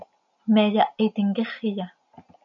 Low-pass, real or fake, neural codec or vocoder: 7.2 kHz; real; none